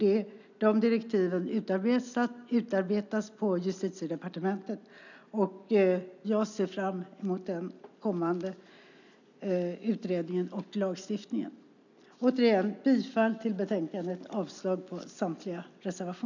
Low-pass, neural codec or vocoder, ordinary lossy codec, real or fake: 7.2 kHz; none; none; real